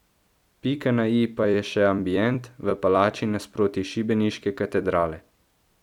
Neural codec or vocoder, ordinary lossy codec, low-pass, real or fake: vocoder, 44.1 kHz, 128 mel bands every 256 samples, BigVGAN v2; none; 19.8 kHz; fake